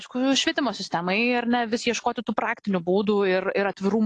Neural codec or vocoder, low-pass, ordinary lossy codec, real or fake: none; 10.8 kHz; AAC, 48 kbps; real